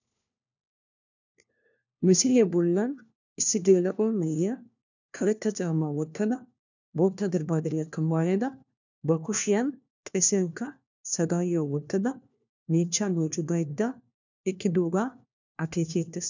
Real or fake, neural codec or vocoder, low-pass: fake; codec, 16 kHz, 1 kbps, FunCodec, trained on LibriTTS, 50 frames a second; 7.2 kHz